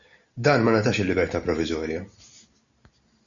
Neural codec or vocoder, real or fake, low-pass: none; real; 7.2 kHz